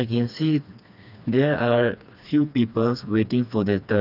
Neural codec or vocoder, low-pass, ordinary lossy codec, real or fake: codec, 16 kHz, 2 kbps, FreqCodec, smaller model; 5.4 kHz; none; fake